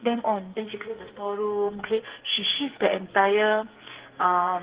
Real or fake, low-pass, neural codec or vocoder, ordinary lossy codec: fake; 3.6 kHz; codec, 44.1 kHz, 2.6 kbps, SNAC; Opus, 16 kbps